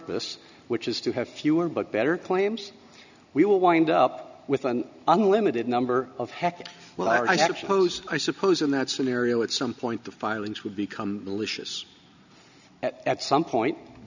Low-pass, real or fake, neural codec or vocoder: 7.2 kHz; real; none